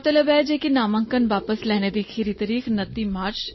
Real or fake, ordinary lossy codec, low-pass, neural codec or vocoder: real; MP3, 24 kbps; 7.2 kHz; none